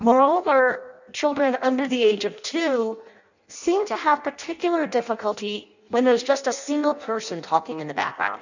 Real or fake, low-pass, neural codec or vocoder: fake; 7.2 kHz; codec, 16 kHz in and 24 kHz out, 0.6 kbps, FireRedTTS-2 codec